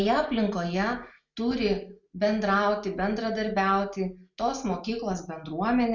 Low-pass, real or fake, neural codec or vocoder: 7.2 kHz; real; none